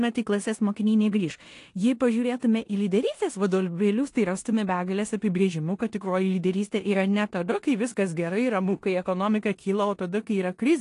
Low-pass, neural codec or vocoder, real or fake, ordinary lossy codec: 10.8 kHz; codec, 16 kHz in and 24 kHz out, 0.9 kbps, LongCat-Audio-Codec, four codebook decoder; fake; AAC, 48 kbps